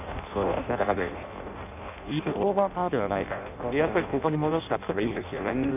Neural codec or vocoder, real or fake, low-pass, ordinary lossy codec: codec, 16 kHz in and 24 kHz out, 0.6 kbps, FireRedTTS-2 codec; fake; 3.6 kHz; none